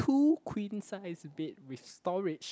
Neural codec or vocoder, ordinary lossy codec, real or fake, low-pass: none; none; real; none